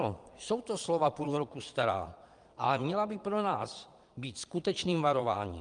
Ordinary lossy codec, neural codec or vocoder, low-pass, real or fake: Opus, 32 kbps; vocoder, 22.05 kHz, 80 mel bands, Vocos; 9.9 kHz; fake